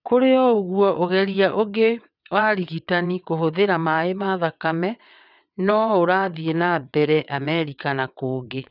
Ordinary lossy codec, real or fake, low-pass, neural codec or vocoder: none; fake; 5.4 kHz; vocoder, 22.05 kHz, 80 mel bands, WaveNeXt